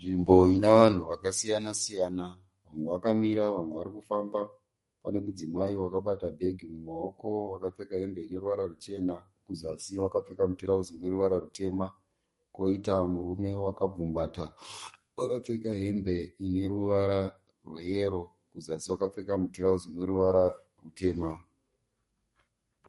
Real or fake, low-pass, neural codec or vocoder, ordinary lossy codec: fake; 14.4 kHz; codec, 32 kHz, 1.9 kbps, SNAC; MP3, 48 kbps